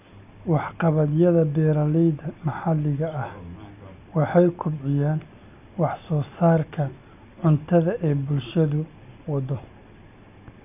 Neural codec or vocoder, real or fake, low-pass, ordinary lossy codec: none; real; 3.6 kHz; none